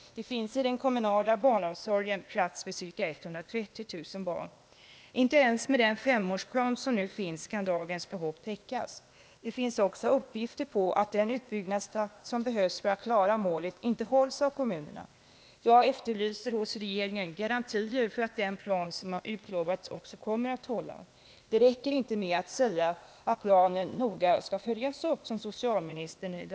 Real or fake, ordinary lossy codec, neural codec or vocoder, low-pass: fake; none; codec, 16 kHz, 0.8 kbps, ZipCodec; none